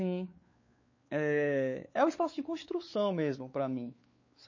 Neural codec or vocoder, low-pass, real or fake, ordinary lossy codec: codec, 16 kHz, 4 kbps, X-Codec, WavLM features, trained on Multilingual LibriSpeech; 7.2 kHz; fake; MP3, 32 kbps